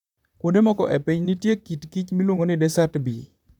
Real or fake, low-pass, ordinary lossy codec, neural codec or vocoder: fake; 19.8 kHz; none; vocoder, 44.1 kHz, 128 mel bands, Pupu-Vocoder